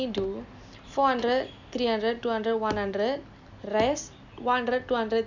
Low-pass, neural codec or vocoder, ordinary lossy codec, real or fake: 7.2 kHz; none; none; real